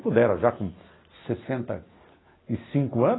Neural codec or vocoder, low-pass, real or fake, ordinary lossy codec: none; 7.2 kHz; real; AAC, 16 kbps